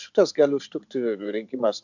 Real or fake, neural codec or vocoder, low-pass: fake; vocoder, 24 kHz, 100 mel bands, Vocos; 7.2 kHz